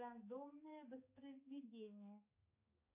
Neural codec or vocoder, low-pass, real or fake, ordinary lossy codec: codec, 16 kHz, 4 kbps, X-Codec, HuBERT features, trained on balanced general audio; 3.6 kHz; fake; MP3, 24 kbps